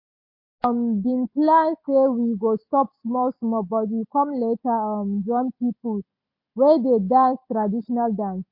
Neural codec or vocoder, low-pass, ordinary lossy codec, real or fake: none; 5.4 kHz; MP3, 32 kbps; real